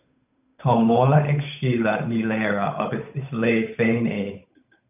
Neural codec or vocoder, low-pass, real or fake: codec, 16 kHz, 8 kbps, FunCodec, trained on Chinese and English, 25 frames a second; 3.6 kHz; fake